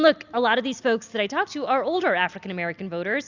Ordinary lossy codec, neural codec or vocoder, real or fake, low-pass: Opus, 64 kbps; none; real; 7.2 kHz